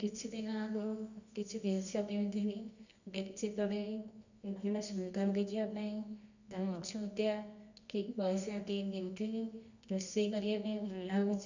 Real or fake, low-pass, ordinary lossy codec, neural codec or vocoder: fake; 7.2 kHz; none; codec, 24 kHz, 0.9 kbps, WavTokenizer, medium music audio release